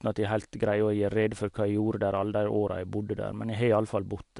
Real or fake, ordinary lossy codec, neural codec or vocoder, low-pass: real; AAC, 64 kbps; none; 10.8 kHz